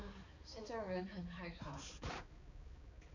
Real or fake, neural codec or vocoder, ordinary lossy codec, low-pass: fake; codec, 16 kHz, 4 kbps, X-Codec, HuBERT features, trained on balanced general audio; none; 7.2 kHz